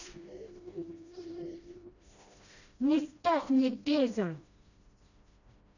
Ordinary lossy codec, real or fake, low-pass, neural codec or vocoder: none; fake; 7.2 kHz; codec, 16 kHz, 1 kbps, FreqCodec, smaller model